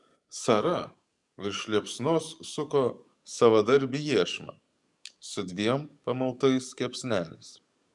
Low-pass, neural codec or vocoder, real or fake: 10.8 kHz; codec, 44.1 kHz, 7.8 kbps, DAC; fake